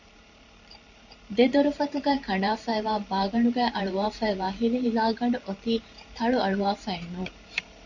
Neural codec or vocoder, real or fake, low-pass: vocoder, 44.1 kHz, 128 mel bands every 512 samples, BigVGAN v2; fake; 7.2 kHz